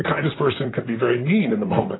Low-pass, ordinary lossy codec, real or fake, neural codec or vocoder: 7.2 kHz; AAC, 16 kbps; fake; vocoder, 44.1 kHz, 128 mel bands, Pupu-Vocoder